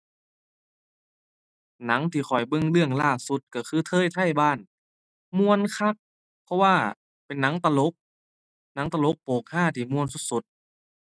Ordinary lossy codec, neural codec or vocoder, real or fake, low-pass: none; none; real; none